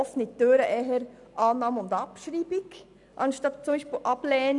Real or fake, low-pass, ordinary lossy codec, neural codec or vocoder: real; 10.8 kHz; none; none